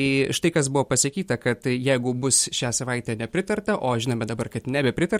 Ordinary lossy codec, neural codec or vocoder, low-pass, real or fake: MP3, 64 kbps; none; 19.8 kHz; real